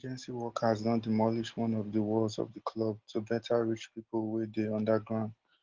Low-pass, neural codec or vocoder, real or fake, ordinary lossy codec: 7.2 kHz; none; real; Opus, 16 kbps